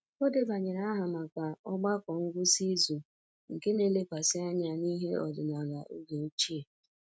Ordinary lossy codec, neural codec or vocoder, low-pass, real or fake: none; none; none; real